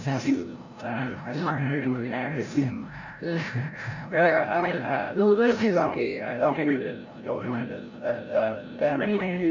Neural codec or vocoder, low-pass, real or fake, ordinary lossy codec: codec, 16 kHz, 0.5 kbps, FreqCodec, larger model; 7.2 kHz; fake; none